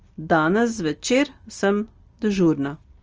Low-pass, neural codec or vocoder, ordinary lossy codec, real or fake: 7.2 kHz; none; Opus, 24 kbps; real